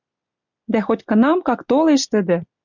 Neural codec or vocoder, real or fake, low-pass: none; real; 7.2 kHz